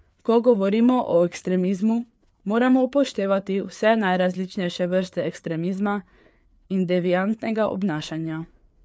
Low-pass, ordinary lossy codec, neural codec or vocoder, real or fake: none; none; codec, 16 kHz, 4 kbps, FreqCodec, larger model; fake